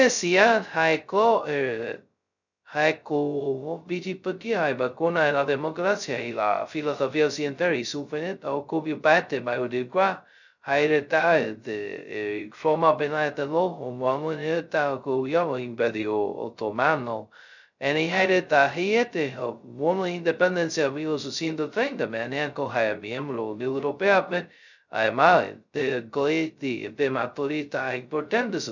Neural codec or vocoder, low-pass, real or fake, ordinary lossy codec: codec, 16 kHz, 0.2 kbps, FocalCodec; 7.2 kHz; fake; none